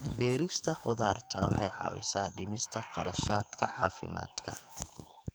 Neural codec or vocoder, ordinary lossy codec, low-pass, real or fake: codec, 44.1 kHz, 2.6 kbps, SNAC; none; none; fake